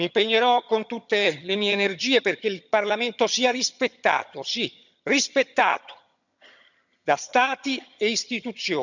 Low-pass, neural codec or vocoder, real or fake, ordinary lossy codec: 7.2 kHz; vocoder, 22.05 kHz, 80 mel bands, HiFi-GAN; fake; none